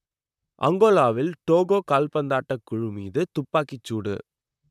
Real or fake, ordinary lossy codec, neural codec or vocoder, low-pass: fake; none; vocoder, 44.1 kHz, 128 mel bands, Pupu-Vocoder; 14.4 kHz